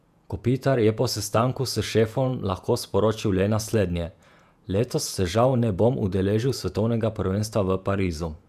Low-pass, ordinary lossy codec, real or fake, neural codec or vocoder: 14.4 kHz; none; fake; vocoder, 48 kHz, 128 mel bands, Vocos